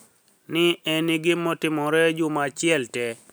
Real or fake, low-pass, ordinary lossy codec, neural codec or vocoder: real; none; none; none